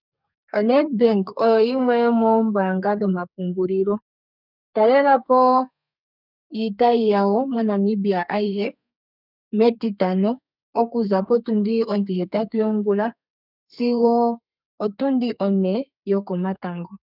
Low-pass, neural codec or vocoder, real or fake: 5.4 kHz; codec, 32 kHz, 1.9 kbps, SNAC; fake